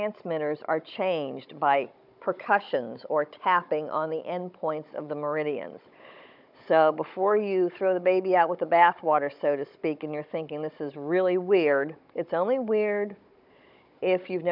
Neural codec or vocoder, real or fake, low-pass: codec, 16 kHz, 16 kbps, FunCodec, trained on Chinese and English, 50 frames a second; fake; 5.4 kHz